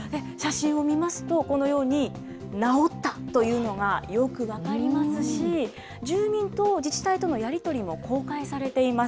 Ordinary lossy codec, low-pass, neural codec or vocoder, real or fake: none; none; none; real